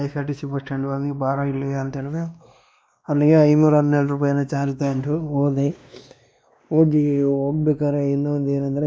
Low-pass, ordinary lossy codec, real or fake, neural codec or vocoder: none; none; fake; codec, 16 kHz, 2 kbps, X-Codec, WavLM features, trained on Multilingual LibriSpeech